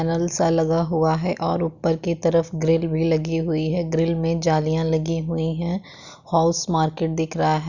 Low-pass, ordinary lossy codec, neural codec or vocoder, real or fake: 7.2 kHz; Opus, 64 kbps; none; real